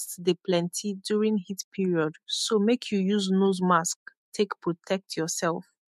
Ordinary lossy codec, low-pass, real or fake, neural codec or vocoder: MP3, 64 kbps; 14.4 kHz; fake; autoencoder, 48 kHz, 128 numbers a frame, DAC-VAE, trained on Japanese speech